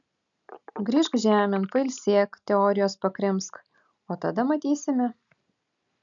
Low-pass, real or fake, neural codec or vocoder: 7.2 kHz; real; none